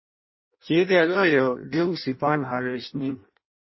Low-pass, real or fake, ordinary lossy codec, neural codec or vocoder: 7.2 kHz; fake; MP3, 24 kbps; codec, 16 kHz in and 24 kHz out, 0.6 kbps, FireRedTTS-2 codec